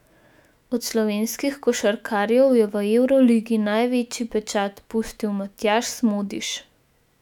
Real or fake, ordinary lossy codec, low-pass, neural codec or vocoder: fake; none; 19.8 kHz; autoencoder, 48 kHz, 128 numbers a frame, DAC-VAE, trained on Japanese speech